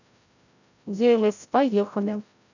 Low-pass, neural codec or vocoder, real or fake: 7.2 kHz; codec, 16 kHz, 0.5 kbps, FreqCodec, larger model; fake